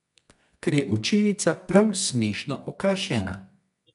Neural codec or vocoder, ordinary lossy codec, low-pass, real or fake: codec, 24 kHz, 0.9 kbps, WavTokenizer, medium music audio release; none; 10.8 kHz; fake